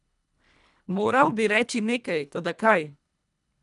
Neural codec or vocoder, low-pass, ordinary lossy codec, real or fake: codec, 24 kHz, 1.5 kbps, HILCodec; 10.8 kHz; none; fake